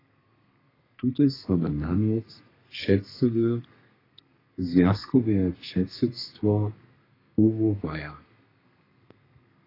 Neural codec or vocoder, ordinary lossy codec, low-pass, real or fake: codec, 32 kHz, 1.9 kbps, SNAC; AAC, 24 kbps; 5.4 kHz; fake